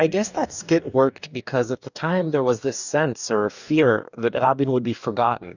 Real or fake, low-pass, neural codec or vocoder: fake; 7.2 kHz; codec, 44.1 kHz, 2.6 kbps, DAC